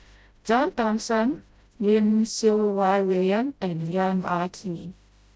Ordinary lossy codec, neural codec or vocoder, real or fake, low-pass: none; codec, 16 kHz, 0.5 kbps, FreqCodec, smaller model; fake; none